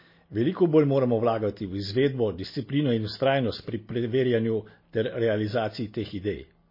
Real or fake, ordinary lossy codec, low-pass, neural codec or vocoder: real; MP3, 24 kbps; 5.4 kHz; none